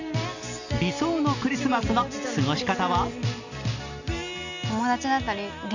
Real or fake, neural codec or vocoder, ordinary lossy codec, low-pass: real; none; none; 7.2 kHz